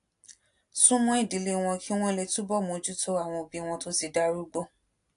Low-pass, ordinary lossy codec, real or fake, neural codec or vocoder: 10.8 kHz; AAC, 64 kbps; real; none